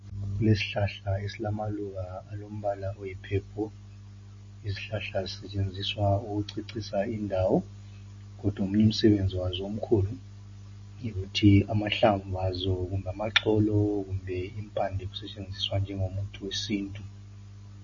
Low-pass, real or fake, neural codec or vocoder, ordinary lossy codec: 7.2 kHz; real; none; MP3, 32 kbps